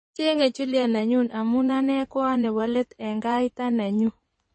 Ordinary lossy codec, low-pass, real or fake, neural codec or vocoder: MP3, 32 kbps; 9.9 kHz; fake; codec, 16 kHz in and 24 kHz out, 2.2 kbps, FireRedTTS-2 codec